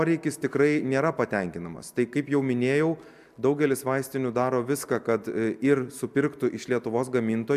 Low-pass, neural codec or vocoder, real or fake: 14.4 kHz; none; real